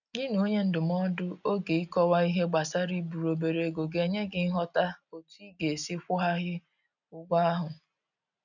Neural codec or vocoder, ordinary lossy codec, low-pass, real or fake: none; none; 7.2 kHz; real